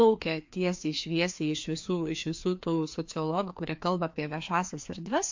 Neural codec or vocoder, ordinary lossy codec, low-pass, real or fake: codec, 16 kHz, 2 kbps, FreqCodec, larger model; MP3, 48 kbps; 7.2 kHz; fake